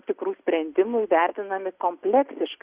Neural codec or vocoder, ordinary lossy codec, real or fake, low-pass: none; Opus, 64 kbps; real; 3.6 kHz